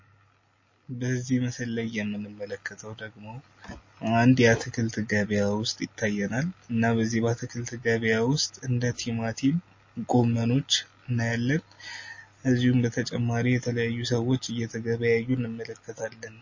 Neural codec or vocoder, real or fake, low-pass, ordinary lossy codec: none; real; 7.2 kHz; MP3, 32 kbps